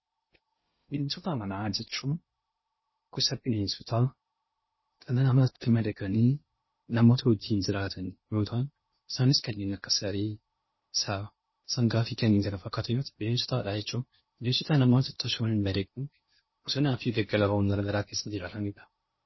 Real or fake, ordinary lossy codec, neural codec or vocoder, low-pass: fake; MP3, 24 kbps; codec, 16 kHz in and 24 kHz out, 0.6 kbps, FocalCodec, streaming, 2048 codes; 7.2 kHz